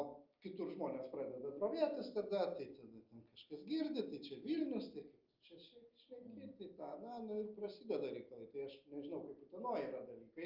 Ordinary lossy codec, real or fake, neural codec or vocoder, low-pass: Opus, 24 kbps; real; none; 5.4 kHz